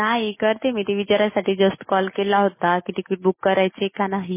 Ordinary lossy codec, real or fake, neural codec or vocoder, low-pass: MP3, 16 kbps; real; none; 3.6 kHz